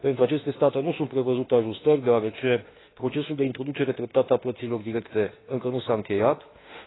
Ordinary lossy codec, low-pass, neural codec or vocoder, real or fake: AAC, 16 kbps; 7.2 kHz; autoencoder, 48 kHz, 32 numbers a frame, DAC-VAE, trained on Japanese speech; fake